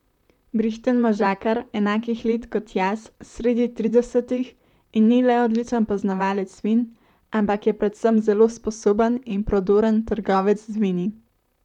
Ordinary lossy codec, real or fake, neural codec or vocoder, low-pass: none; fake; vocoder, 44.1 kHz, 128 mel bands, Pupu-Vocoder; 19.8 kHz